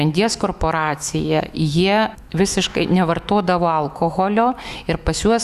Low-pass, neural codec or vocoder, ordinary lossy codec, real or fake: 14.4 kHz; autoencoder, 48 kHz, 128 numbers a frame, DAC-VAE, trained on Japanese speech; Opus, 64 kbps; fake